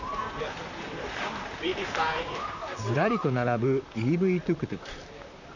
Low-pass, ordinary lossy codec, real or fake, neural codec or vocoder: 7.2 kHz; none; fake; vocoder, 44.1 kHz, 128 mel bands, Pupu-Vocoder